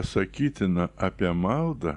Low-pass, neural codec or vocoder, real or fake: 10.8 kHz; none; real